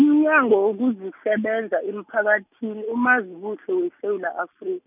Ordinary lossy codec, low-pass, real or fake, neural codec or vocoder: none; 3.6 kHz; real; none